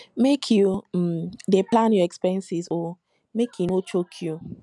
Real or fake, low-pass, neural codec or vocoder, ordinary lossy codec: real; 10.8 kHz; none; none